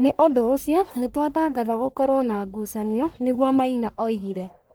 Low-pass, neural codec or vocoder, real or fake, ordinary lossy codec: none; codec, 44.1 kHz, 1.7 kbps, Pupu-Codec; fake; none